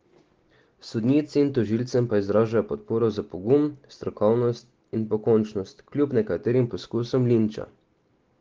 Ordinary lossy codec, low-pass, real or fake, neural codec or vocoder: Opus, 16 kbps; 7.2 kHz; real; none